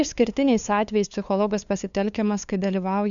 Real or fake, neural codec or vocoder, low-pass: fake; codec, 16 kHz, 2 kbps, FunCodec, trained on LibriTTS, 25 frames a second; 7.2 kHz